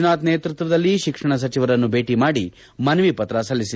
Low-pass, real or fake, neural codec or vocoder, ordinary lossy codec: none; real; none; none